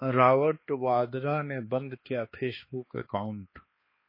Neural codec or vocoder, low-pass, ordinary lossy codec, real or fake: codec, 16 kHz, 2 kbps, X-Codec, HuBERT features, trained on balanced general audio; 5.4 kHz; MP3, 24 kbps; fake